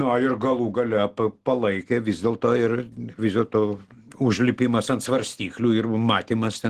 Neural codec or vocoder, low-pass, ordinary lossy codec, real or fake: none; 14.4 kHz; Opus, 16 kbps; real